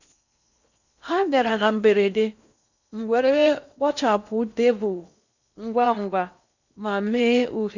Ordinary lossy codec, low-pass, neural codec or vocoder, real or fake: none; 7.2 kHz; codec, 16 kHz in and 24 kHz out, 0.8 kbps, FocalCodec, streaming, 65536 codes; fake